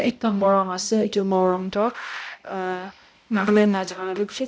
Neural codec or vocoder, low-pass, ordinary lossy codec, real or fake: codec, 16 kHz, 0.5 kbps, X-Codec, HuBERT features, trained on balanced general audio; none; none; fake